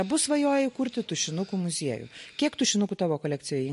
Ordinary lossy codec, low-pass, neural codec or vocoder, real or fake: MP3, 48 kbps; 14.4 kHz; none; real